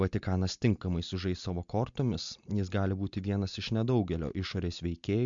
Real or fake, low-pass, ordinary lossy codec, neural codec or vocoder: real; 7.2 kHz; MP3, 64 kbps; none